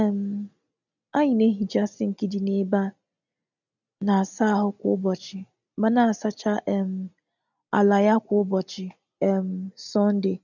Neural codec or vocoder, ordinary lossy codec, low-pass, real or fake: none; none; 7.2 kHz; real